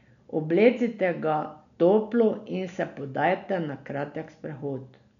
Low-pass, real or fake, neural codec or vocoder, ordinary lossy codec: 7.2 kHz; real; none; none